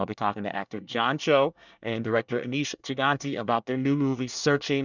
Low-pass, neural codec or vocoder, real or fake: 7.2 kHz; codec, 24 kHz, 1 kbps, SNAC; fake